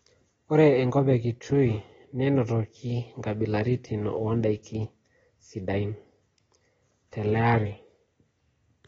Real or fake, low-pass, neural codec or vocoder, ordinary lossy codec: real; 14.4 kHz; none; AAC, 24 kbps